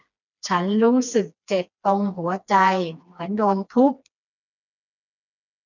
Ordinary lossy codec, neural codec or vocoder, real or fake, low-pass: none; codec, 16 kHz, 2 kbps, FreqCodec, smaller model; fake; 7.2 kHz